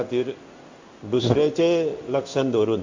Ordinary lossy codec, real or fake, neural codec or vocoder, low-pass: MP3, 48 kbps; fake; codec, 16 kHz in and 24 kHz out, 1 kbps, XY-Tokenizer; 7.2 kHz